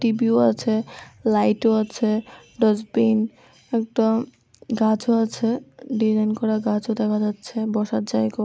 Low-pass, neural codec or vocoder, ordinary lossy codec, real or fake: none; none; none; real